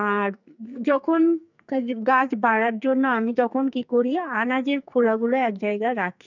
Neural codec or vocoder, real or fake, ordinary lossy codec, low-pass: codec, 44.1 kHz, 2.6 kbps, SNAC; fake; none; 7.2 kHz